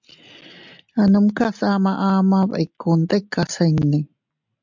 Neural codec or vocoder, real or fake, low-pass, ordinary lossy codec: none; real; 7.2 kHz; MP3, 64 kbps